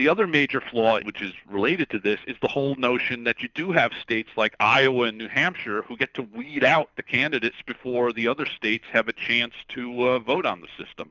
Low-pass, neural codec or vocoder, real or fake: 7.2 kHz; codec, 24 kHz, 6 kbps, HILCodec; fake